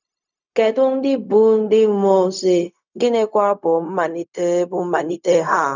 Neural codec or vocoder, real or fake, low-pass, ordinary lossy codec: codec, 16 kHz, 0.4 kbps, LongCat-Audio-Codec; fake; 7.2 kHz; none